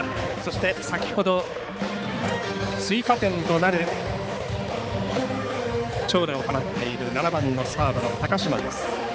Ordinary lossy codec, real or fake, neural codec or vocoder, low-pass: none; fake; codec, 16 kHz, 4 kbps, X-Codec, HuBERT features, trained on balanced general audio; none